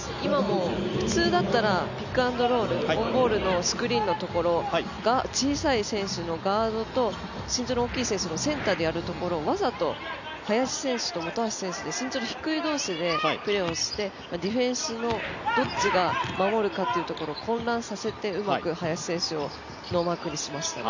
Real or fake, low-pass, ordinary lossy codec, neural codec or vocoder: real; 7.2 kHz; none; none